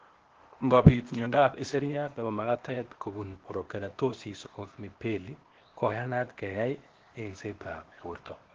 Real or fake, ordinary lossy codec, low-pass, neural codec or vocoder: fake; Opus, 16 kbps; 7.2 kHz; codec, 16 kHz, 0.8 kbps, ZipCodec